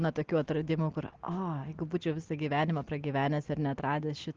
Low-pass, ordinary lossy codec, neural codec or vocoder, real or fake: 7.2 kHz; Opus, 16 kbps; none; real